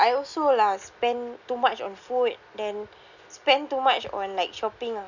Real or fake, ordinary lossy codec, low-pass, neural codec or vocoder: real; none; 7.2 kHz; none